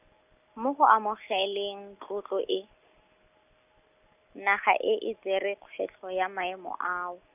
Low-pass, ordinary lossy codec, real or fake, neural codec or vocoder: 3.6 kHz; none; real; none